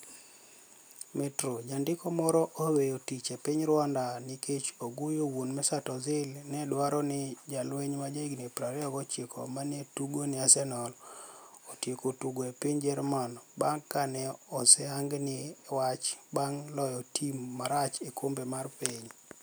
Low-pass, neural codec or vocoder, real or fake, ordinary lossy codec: none; none; real; none